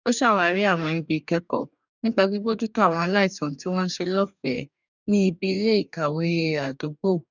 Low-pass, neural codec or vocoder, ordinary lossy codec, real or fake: 7.2 kHz; codec, 44.1 kHz, 2.6 kbps, DAC; none; fake